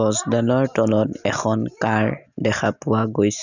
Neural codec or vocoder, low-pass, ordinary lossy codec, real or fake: none; 7.2 kHz; none; real